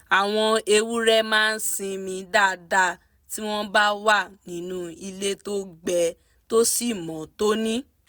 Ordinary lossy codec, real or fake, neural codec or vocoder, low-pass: none; real; none; none